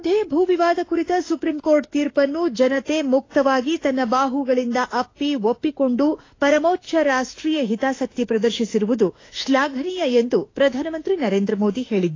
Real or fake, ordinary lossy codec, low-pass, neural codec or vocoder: fake; AAC, 32 kbps; 7.2 kHz; autoencoder, 48 kHz, 32 numbers a frame, DAC-VAE, trained on Japanese speech